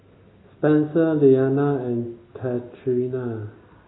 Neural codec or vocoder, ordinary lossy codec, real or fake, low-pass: none; AAC, 16 kbps; real; 7.2 kHz